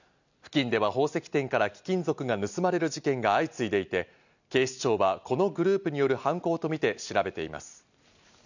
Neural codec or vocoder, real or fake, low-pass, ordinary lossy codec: none; real; 7.2 kHz; none